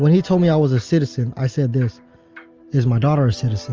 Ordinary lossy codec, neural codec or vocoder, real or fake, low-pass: Opus, 24 kbps; none; real; 7.2 kHz